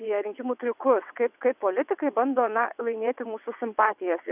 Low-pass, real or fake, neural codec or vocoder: 3.6 kHz; fake; vocoder, 22.05 kHz, 80 mel bands, WaveNeXt